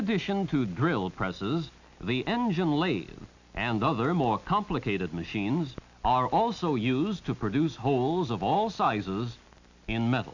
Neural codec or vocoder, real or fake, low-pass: none; real; 7.2 kHz